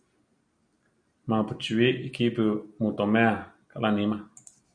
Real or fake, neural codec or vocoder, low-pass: real; none; 9.9 kHz